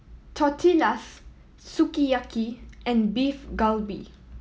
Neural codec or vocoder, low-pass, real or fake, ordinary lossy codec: none; none; real; none